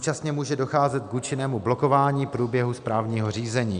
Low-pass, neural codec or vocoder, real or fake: 9.9 kHz; none; real